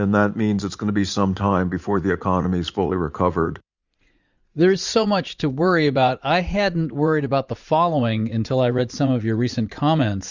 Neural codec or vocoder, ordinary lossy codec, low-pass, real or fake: vocoder, 44.1 kHz, 80 mel bands, Vocos; Opus, 64 kbps; 7.2 kHz; fake